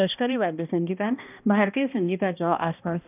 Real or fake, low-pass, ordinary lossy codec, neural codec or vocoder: fake; 3.6 kHz; none; codec, 16 kHz, 1 kbps, X-Codec, HuBERT features, trained on balanced general audio